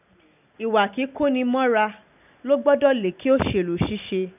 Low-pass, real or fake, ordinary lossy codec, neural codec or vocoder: 3.6 kHz; real; none; none